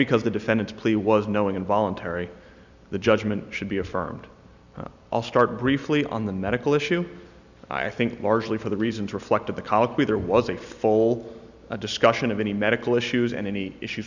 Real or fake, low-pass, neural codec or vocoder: real; 7.2 kHz; none